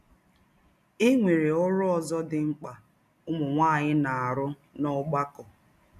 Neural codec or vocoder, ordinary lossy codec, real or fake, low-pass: none; none; real; 14.4 kHz